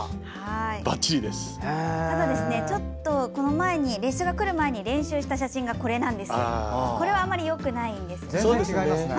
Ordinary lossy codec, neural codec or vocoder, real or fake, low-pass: none; none; real; none